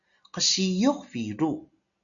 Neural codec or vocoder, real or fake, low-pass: none; real; 7.2 kHz